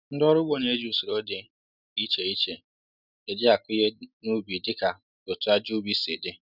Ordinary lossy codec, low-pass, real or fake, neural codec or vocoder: none; 5.4 kHz; real; none